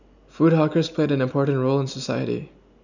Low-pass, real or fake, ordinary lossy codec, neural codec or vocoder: 7.2 kHz; real; none; none